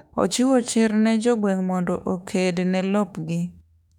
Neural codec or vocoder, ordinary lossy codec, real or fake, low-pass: autoencoder, 48 kHz, 32 numbers a frame, DAC-VAE, trained on Japanese speech; none; fake; 19.8 kHz